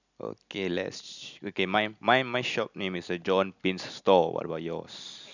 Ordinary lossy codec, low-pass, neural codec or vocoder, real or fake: none; 7.2 kHz; none; real